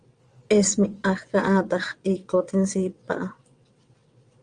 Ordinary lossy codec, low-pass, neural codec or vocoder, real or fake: Opus, 32 kbps; 9.9 kHz; vocoder, 22.05 kHz, 80 mel bands, WaveNeXt; fake